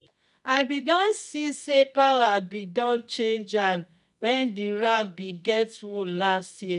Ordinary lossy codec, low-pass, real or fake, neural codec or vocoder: none; 10.8 kHz; fake; codec, 24 kHz, 0.9 kbps, WavTokenizer, medium music audio release